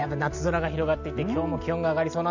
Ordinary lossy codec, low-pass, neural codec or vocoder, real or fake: none; 7.2 kHz; none; real